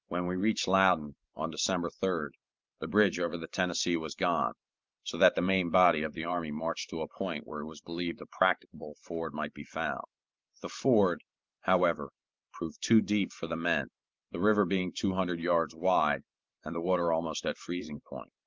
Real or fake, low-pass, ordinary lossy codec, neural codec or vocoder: fake; 7.2 kHz; Opus, 32 kbps; vocoder, 44.1 kHz, 128 mel bands every 512 samples, BigVGAN v2